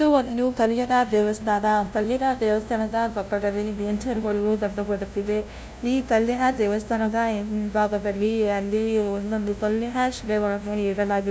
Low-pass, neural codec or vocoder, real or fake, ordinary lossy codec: none; codec, 16 kHz, 0.5 kbps, FunCodec, trained on LibriTTS, 25 frames a second; fake; none